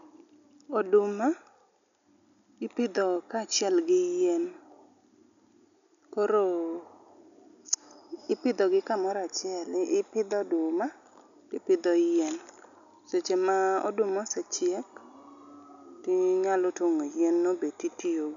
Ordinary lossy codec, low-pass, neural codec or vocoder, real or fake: none; 7.2 kHz; none; real